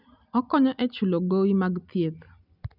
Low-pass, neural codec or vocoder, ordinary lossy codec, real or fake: 5.4 kHz; none; none; real